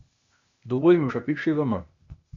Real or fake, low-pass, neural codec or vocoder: fake; 7.2 kHz; codec, 16 kHz, 0.8 kbps, ZipCodec